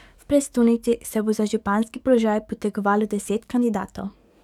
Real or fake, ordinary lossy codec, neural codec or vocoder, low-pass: fake; none; codec, 44.1 kHz, 7.8 kbps, DAC; 19.8 kHz